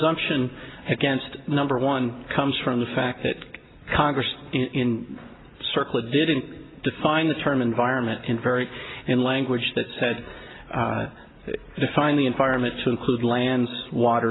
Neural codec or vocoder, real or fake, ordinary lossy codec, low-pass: none; real; AAC, 16 kbps; 7.2 kHz